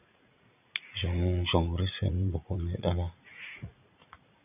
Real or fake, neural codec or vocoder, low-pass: fake; vocoder, 44.1 kHz, 80 mel bands, Vocos; 3.6 kHz